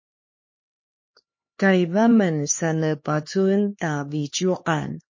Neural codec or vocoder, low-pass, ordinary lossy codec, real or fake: codec, 16 kHz, 2 kbps, X-Codec, HuBERT features, trained on LibriSpeech; 7.2 kHz; MP3, 32 kbps; fake